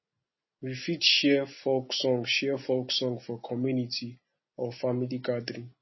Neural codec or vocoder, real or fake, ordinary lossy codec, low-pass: none; real; MP3, 24 kbps; 7.2 kHz